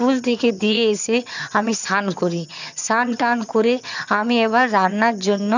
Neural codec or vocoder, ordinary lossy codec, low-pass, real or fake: vocoder, 22.05 kHz, 80 mel bands, HiFi-GAN; none; 7.2 kHz; fake